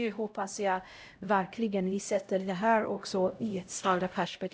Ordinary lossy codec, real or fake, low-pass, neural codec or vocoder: none; fake; none; codec, 16 kHz, 0.5 kbps, X-Codec, HuBERT features, trained on LibriSpeech